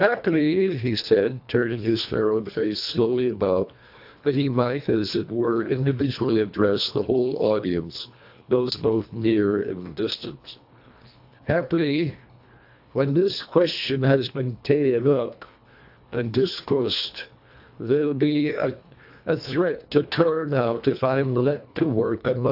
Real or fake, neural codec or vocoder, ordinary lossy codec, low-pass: fake; codec, 24 kHz, 1.5 kbps, HILCodec; AAC, 48 kbps; 5.4 kHz